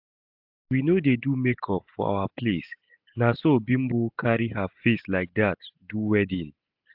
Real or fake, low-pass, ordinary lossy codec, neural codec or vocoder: real; 5.4 kHz; AAC, 48 kbps; none